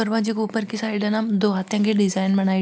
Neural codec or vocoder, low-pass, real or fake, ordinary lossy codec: none; none; real; none